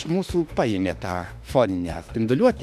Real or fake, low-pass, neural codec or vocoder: fake; 14.4 kHz; autoencoder, 48 kHz, 32 numbers a frame, DAC-VAE, trained on Japanese speech